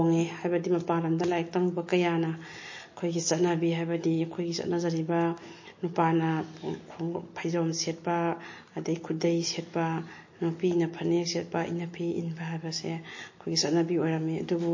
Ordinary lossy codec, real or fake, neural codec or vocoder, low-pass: MP3, 32 kbps; real; none; 7.2 kHz